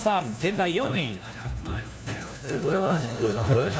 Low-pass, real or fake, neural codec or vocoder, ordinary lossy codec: none; fake; codec, 16 kHz, 1 kbps, FunCodec, trained on LibriTTS, 50 frames a second; none